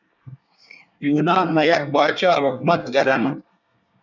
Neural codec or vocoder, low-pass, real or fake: codec, 24 kHz, 1 kbps, SNAC; 7.2 kHz; fake